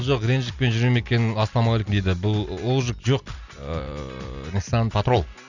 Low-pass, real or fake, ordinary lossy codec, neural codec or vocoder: 7.2 kHz; real; none; none